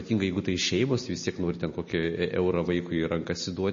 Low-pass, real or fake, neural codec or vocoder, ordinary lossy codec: 7.2 kHz; real; none; MP3, 32 kbps